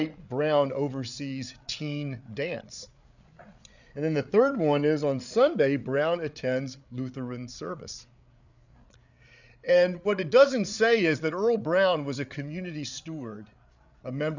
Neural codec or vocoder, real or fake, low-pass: codec, 16 kHz, 8 kbps, FreqCodec, larger model; fake; 7.2 kHz